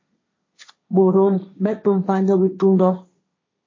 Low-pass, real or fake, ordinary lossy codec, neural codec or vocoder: 7.2 kHz; fake; MP3, 32 kbps; codec, 16 kHz, 1.1 kbps, Voila-Tokenizer